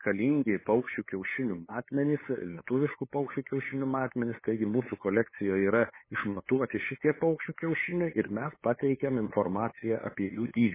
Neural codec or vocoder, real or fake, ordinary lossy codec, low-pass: codec, 16 kHz, 8 kbps, FunCodec, trained on LibriTTS, 25 frames a second; fake; MP3, 16 kbps; 3.6 kHz